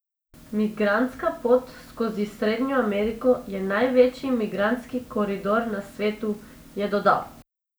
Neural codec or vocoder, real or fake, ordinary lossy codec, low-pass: none; real; none; none